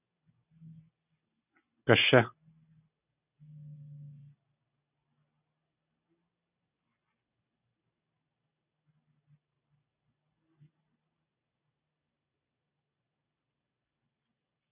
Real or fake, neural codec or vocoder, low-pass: real; none; 3.6 kHz